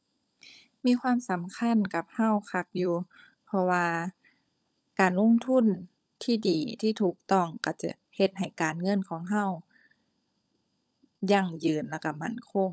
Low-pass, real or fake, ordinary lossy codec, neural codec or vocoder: none; fake; none; codec, 16 kHz, 16 kbps, FunCodec, trained on LibriTTS, 50 frames a second